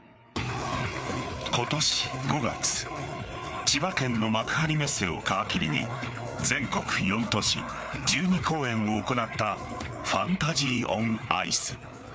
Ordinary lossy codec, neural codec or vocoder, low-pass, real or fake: none; codec, 16 kHz, 4 kbps, FreqCodec, larger model; none; fake